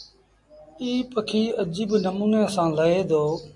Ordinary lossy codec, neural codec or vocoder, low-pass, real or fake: MP3, 64 kbps; none; 10.8 kHz; real